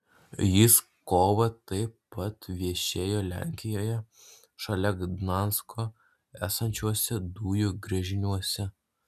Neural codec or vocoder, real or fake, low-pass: none; real; 14.4 kHz